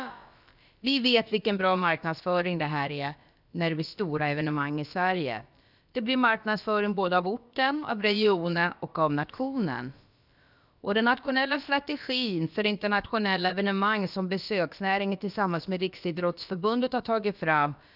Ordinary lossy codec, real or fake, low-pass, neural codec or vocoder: none; fake; 5.4 kHz; codec, 16 kHz, about 1 kbps, DyCAST, with the encoder's durations